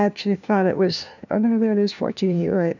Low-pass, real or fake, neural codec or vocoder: 7.2 kHz; fake; codec, 16 kHz, 1 kbps, FunCodec, trained on LibriTTS, 50 frames a second